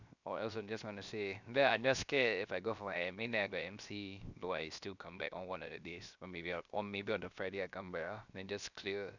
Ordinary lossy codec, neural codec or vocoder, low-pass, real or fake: none; codec, 16 kHz, 0.7 kbps, FocalCodec; 7.2 kHz; fake